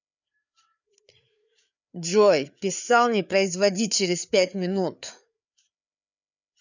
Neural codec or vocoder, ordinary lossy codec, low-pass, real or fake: codec, 16 kHz, 4 kbps, FreqCodec, larger model; none; 7.2 kHz; fake